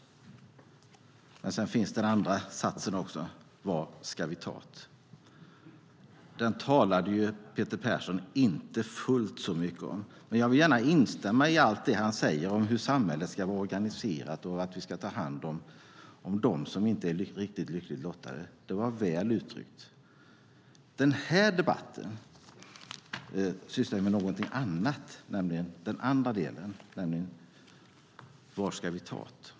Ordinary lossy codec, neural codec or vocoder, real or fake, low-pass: none; none; real; none